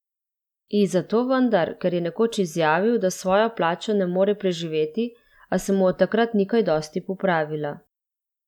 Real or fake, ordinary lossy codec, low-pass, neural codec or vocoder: real; none; 19.8 kHz; none